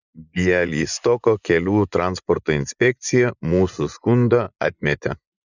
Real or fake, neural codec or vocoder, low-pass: fake; vocoder, 24 kHz, 100 mel bands, Vocos; 7.2 kHz